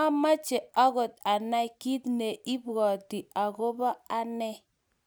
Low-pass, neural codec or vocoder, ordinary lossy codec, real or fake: none; none; none; real